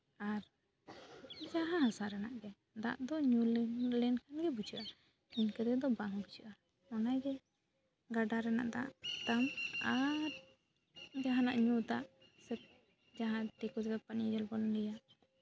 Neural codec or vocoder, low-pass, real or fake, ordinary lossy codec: none; none; real; none